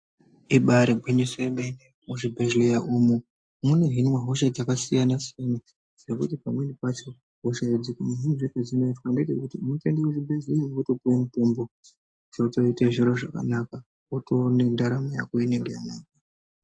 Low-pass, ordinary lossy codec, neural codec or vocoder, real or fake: 9.9 kHz; AAC, 64 kbps; none; real